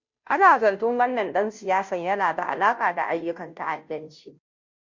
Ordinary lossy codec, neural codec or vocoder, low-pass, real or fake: MP3, 48 kbps; codec, 16 kHz, 0.5 kbps, FunCodec, trained on Chinese and English, 25 frames a second; 7.2 kHz; fake